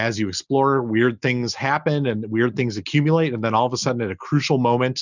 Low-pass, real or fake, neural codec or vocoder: 7.2 kHz; real; none